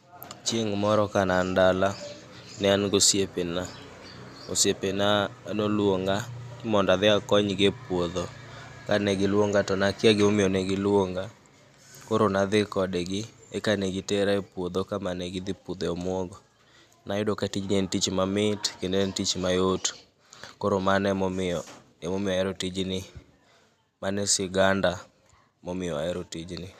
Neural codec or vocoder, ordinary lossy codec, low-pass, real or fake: none; none; 14.4 kHz; real